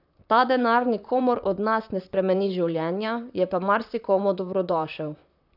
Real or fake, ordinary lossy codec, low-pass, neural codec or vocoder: fake; none; 5.4 kHz; vocoder, 44.1 kHz, 128 mel bands, Pupu-Vocoder